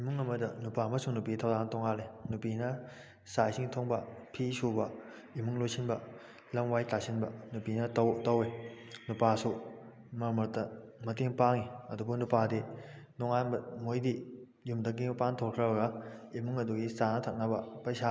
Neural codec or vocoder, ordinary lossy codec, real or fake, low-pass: none; none; real; none